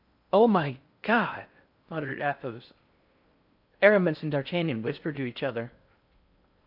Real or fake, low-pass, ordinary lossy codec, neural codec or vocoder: fake; 5.4 kHz; AAC, 48 kbps; codec, 16 kHz in and 24 kHz out, 0.8 kbps, FocalCodec, streaming, 65536 codes